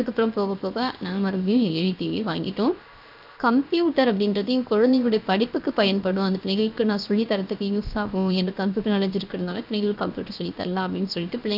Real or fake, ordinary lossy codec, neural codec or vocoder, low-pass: fake; none; codec, 16 kHz, 0.7 kbps, FocalCodec; 5.4 kHz